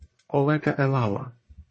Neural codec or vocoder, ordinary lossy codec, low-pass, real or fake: codec, 44.1 kHz, 1.7 kbps, Pupu-Codec; MP3, 32 kbps; 9.9 kHz; fake